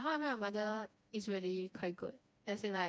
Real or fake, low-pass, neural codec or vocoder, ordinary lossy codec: fake; none; codec, 16 kHz, 2 kbps, FreqCodec, smaller model; none